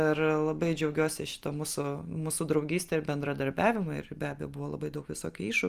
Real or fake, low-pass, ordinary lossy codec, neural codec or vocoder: real; 14.4 kHz; Opus, 32 kbps; none